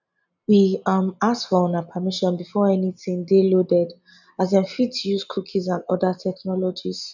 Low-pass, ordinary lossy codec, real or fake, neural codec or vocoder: 7.2 kHz; none; real; none